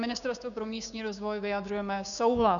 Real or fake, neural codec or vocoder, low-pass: fake; codec, 16 kHz, 6 kbps, DAC; 7.2 kHz